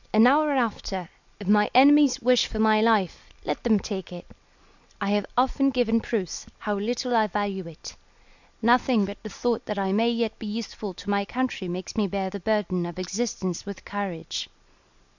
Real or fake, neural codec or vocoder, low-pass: real; none; 7.2 kHz